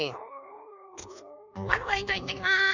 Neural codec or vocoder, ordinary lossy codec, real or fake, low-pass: codec, 24 kHz, 1.2 kbps, DualCodec; none; fake; 7.2 kHz